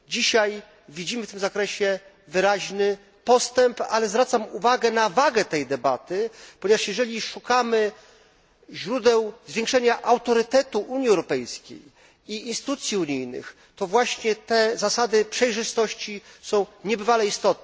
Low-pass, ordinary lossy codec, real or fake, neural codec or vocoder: none; none; real; none